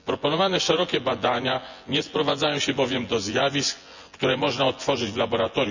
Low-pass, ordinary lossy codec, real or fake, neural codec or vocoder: 7.2 kHz; none; fake; vocoder, 24 kHz, 100 mel bands, Vocos